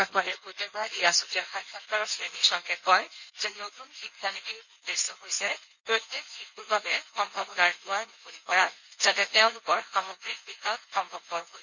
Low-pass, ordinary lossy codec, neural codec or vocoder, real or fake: 7.2 kHz; none; codec, 16 kHz in and 24 kHz out, 1.1 kbps, FireRedTTS-2 codec; fake